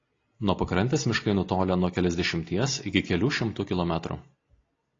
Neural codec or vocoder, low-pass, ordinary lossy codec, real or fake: none; 7.2 kHz; AAC, 32 kbps; real